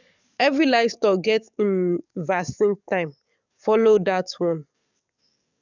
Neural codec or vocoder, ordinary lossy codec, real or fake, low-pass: codec, 44.1 kHz, 7.8 kbps, DAC; none; fake; 7.2 kHz